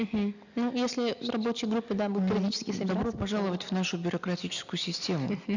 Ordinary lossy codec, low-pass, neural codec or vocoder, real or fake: none; 7.2 kHz; none; real